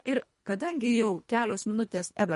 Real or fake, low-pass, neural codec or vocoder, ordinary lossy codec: fake; 10.8 kHz; codec, 24 kHz, 1.5 kbps, HILCodec; MP3, 48 kbps